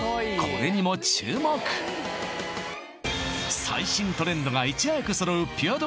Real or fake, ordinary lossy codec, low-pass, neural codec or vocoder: real; none; none; none